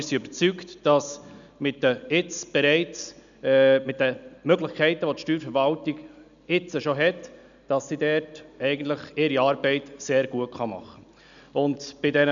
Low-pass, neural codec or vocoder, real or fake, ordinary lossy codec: 7.2 kHz; none; real; none